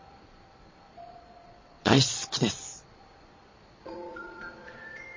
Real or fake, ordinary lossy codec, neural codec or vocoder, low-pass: fake; MP3, 32 kbps; vocoder, 22.05 kHz, 80 mel bands, Vocos; 7.2 kHz